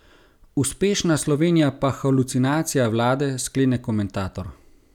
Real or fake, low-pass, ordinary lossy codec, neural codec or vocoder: real; 19.8 kHz; none; none